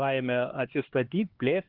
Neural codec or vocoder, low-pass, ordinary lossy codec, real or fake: codec, 16 kHz, 2 kbps, X-Codec, HuBERT features, trained on LibriSpeech; 5.4 kHz; Opus, 16 kbps; fake